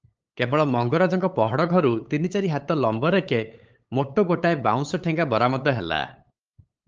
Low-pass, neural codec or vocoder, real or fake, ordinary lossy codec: 7.2 kHz; codec, 16 kHz, 8 kbps, FunCodec, trained on LibriTTS, 25 frames a second; fake; Opus, 24 kbps